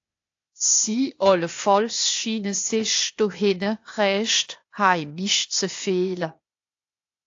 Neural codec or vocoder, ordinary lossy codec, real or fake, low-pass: codec, 16 kHz, 0.8 kbps, ZipCodec; AAC, 48 kbps; fake; 7.2 kHz